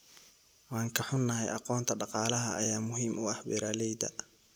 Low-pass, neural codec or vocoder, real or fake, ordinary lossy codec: none; none; real; none